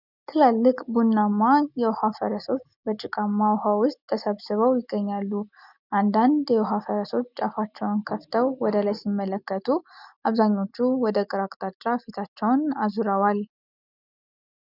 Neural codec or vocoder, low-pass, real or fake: none; 5.4 kHz; real